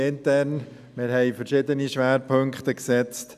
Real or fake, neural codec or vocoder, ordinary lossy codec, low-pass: real; none; none; 14.4 kHz